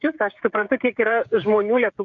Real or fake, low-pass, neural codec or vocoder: fake; 7.2 kHz; codec, 16 kHz, 16 kbps, FreqCodec, smaller model